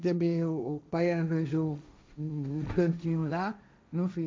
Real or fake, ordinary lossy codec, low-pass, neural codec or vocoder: fake; none; none; codec, 16 kHz, 1.1 kbps, Voila-Tokenizer